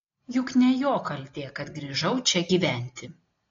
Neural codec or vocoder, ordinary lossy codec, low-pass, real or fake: none; AAC, 32 kbps; 7.2 kHz; real